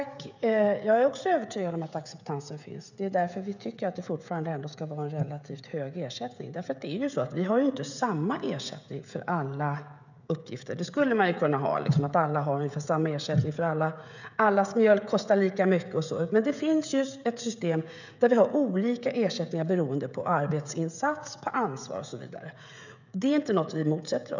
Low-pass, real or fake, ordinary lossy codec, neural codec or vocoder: 7.2 kHz; fake; none; codec, 16 kHz, 16 kbps, FreqCodec, smaller model